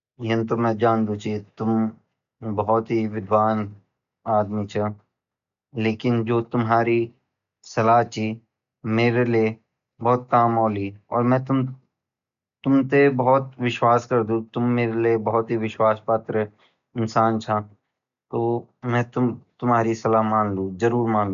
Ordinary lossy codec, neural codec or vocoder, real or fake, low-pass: none; none; real; 7.2 kHz